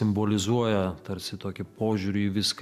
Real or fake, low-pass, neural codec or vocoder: real; 14.4 kHz; none